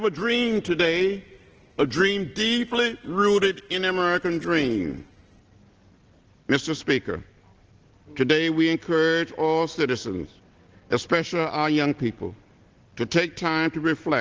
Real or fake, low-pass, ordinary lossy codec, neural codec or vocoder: real; 7.2 kHz; Opus, 16 kbps; none